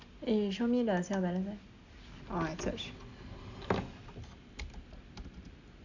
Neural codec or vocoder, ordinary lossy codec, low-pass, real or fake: none; none; 7.2 kHz; real